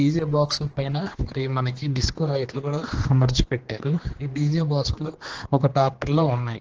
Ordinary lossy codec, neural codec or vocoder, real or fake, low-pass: Opus, 16 kbps; codec, 16 kHz, 2 kbps, X-Codec, HuBERT features, trained on general audio; fake; 7.2 kHz